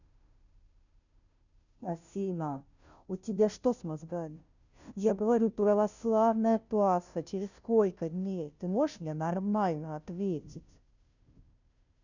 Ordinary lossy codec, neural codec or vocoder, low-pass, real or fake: none; codec, 16 kHz, 0.5 kbps, FunCodec, trained on Chinese and English, 25 frames a second; 7.2 kHz; fake